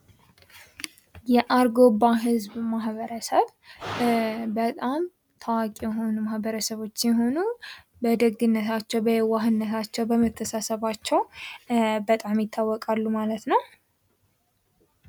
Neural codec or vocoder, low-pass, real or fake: none; 19.8 kHz; real